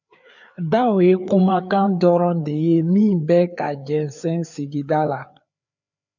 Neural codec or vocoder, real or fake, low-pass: codec, 16 kHz, 4 kbps, FreqCodec, larger model; fake; 7.2 kHz